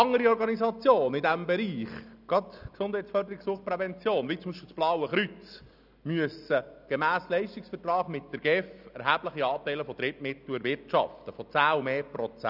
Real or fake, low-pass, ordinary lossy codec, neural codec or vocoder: real; 5.4 kHz; none; none